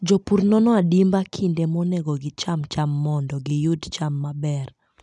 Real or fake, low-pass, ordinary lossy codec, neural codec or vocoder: real; none; none; none